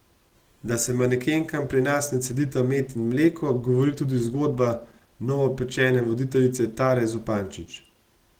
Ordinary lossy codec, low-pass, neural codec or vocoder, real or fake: Opus, 16 kbps; 19.8 kHz; none; real